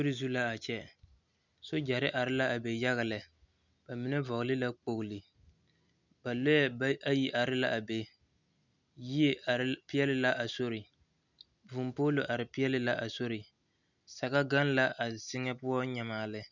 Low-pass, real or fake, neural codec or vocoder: 7.2 kHz; real; none